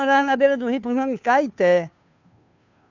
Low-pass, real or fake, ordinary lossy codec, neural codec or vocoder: 7.2 kHz; fake; none; codec, 16 kHz, 2 kbps, FunCodec, trained on Chinese and English, 25 frames a second